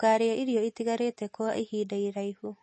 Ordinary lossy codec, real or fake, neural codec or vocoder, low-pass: MP3, 32 kbps; real; none; 10.8 kHz